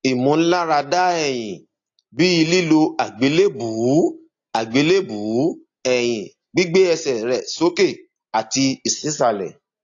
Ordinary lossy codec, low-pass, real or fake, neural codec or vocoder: AAC, 48 kbps; 7.2 kHz; real; none